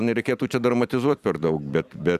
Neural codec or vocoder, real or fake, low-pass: vocoder, 44.1 kHz, 128 mel bands every 256 samples, BigVGAN v2; fake; 14.4 kHz